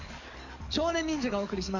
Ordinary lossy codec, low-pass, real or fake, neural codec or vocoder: none; 7.2 kHz; fake; codec, 44.1 kHz, 7.8 kbps, DAC